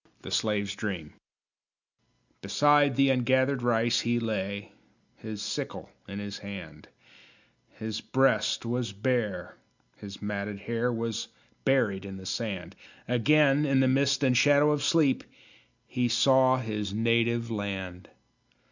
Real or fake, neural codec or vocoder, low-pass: real; none; 7.2 kHz